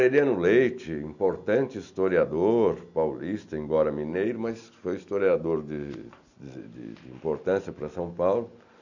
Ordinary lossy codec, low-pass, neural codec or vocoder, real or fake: none; 7.2 kHz; none; real